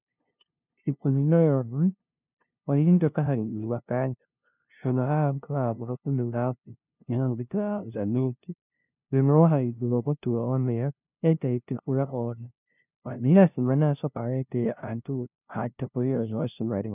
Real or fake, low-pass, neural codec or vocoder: fake; 3.6 kHz; codec, 16 kHz, 0.5 kbps, FunCodec, trained on LibriTTS, 25 frames a second